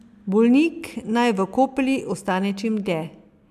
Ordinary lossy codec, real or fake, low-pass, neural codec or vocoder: MP3, 96 kbps; real; 14.4 kHz; none